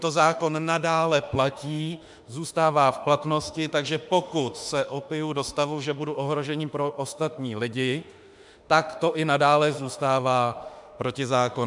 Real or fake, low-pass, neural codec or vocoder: fake; 10.8 kHz; autoencoder, 48 kHz, 32 numbers a frame, DAC-VAE, trained on Japanese speech